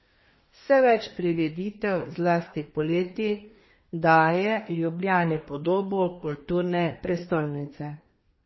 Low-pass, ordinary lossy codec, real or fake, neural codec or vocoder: 7.2 kHz; MP3, 24 kbps; fake; codec, 24 kHz, 1 kbps, SNAC